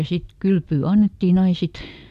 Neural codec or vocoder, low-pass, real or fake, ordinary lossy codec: none; 14.4 kHz; real; none